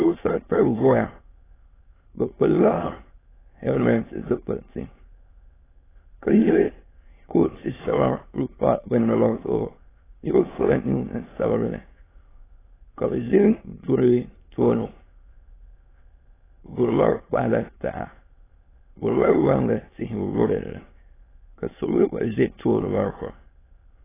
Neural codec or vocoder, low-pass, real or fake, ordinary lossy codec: autoencoder, 22.05 kHz, a latent of 192 numbers a frame, VITS, trained on many speakers; 3.6 kHz; fake; AAC, 16 kbps